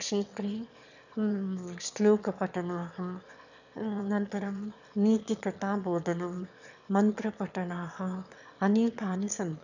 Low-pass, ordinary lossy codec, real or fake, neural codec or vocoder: 7.2 kHz; none; fake; autoencoder, 22.05 kHz, a latent of 192 numbers a frame, VITS, trained on one speaker